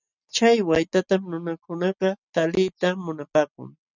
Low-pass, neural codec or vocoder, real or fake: 7.2 kHz; none; real